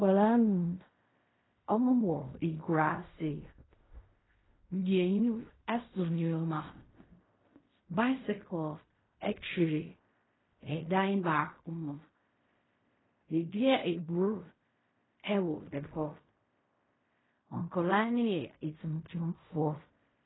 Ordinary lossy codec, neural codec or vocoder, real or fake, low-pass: AAC, 16 kbps; codec, 16 kHz in and 24 kHz out, 0.4 kbps, LongCat-Audio-Codec, fine tuned four codebook decoder; fake; 7.2 kHz